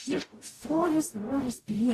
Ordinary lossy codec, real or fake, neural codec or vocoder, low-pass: AAC, 64 kbps; fake; codec, 44.1 kHz, 0.9 kbps, DAC; 14.4 kHz